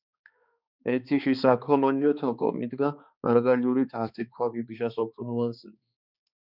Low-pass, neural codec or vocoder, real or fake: 5.4 kHz; codec, 16 kHz, 2 kbps, X-Codec, HuBERT features, trained on balanced general audio; fake